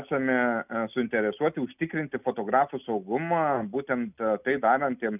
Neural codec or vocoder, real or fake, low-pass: none; real; 3.6 kHz